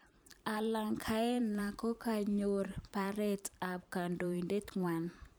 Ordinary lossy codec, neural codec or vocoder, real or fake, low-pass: none; vocoder, 44.1 kHz, 128 mel bands every 512 samples, BigVGAN v2; fake; none